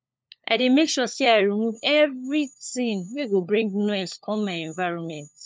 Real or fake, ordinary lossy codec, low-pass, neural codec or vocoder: fake; none; none; codec, 16 kHz, 4 kbps, FunCodec, trained on LibriTTS, 50 frames a second